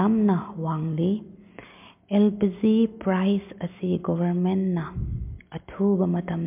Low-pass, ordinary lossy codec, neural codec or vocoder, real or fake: 3.6 kHz; MP3, 32 kbps; none; real